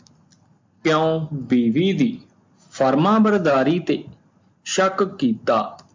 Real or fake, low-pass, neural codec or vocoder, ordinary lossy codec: real; 7.2 kHz; none; MP3, 64 kbps